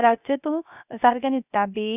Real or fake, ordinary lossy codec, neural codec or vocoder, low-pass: fake; none; codec, 16 kHz, 0.3 kbps, FocalCodec; 3.6 kHz